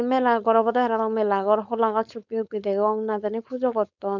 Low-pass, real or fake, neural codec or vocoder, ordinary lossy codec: 7.2 kHz; fake; codec, 16 kHz, 4.8 kbps, FACodec; none